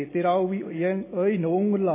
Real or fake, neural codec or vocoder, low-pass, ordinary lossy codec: real; none; 3.6 kHz; MP3, 16 kbps